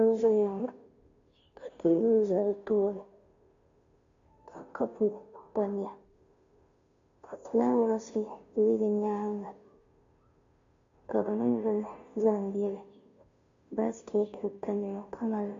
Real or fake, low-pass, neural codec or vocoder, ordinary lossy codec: fake; 7.2 kHz; codec, 16 kHz, 0.5 kbps, FunCodec, trained on Chinese and English, 25 frames a second; MP3, 48 kbps